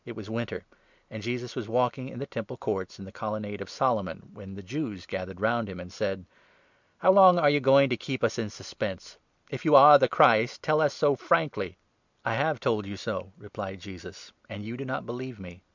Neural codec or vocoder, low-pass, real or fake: none; 7.2 kHz; real